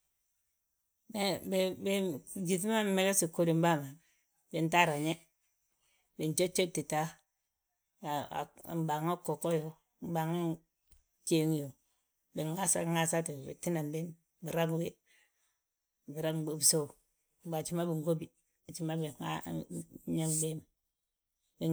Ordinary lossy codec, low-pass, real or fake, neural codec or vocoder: none; none; real; none